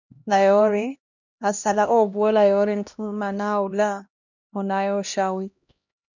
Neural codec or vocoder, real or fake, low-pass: codec, 16 kHz, 1 kbps, X-Codec, HuBERT features, trained on LibriSpeech; fake; 7.2 kHz